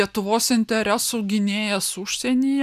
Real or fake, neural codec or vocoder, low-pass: real; none; 14.4 kHz